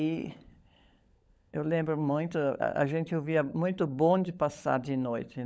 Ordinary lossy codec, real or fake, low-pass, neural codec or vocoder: none; fake; none; codec, 16 kHz, 16 kbps, FunCodec, trained on LibriTTS, 50 frames a second